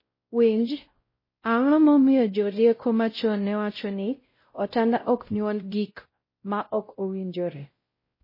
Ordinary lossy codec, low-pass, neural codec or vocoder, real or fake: MP3, 24 kbps; 5.4 kHz; codec, 16 kHz, 0.5 kbps, X-Codec, WavLM features, trained on Multilingual LibriSpeech; fake